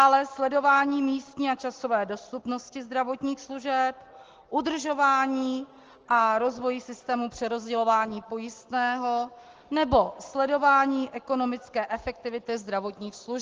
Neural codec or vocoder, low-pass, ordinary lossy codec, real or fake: none; 7.2 kHz; Opus, 16 kbps; real